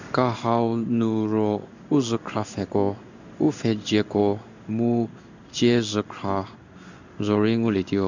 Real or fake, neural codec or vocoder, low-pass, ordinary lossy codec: fake; codec, 16 kHz in and 24 kHz out, 1 kbps, XY-Tokenizer; 7.2 kHz; none